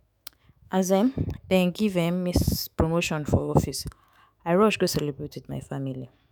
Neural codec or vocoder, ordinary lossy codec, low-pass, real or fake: autoencoder, 48 kHz, 128 numbers a frame, DAC-VAE, trained on Japanese speech; none; none; fake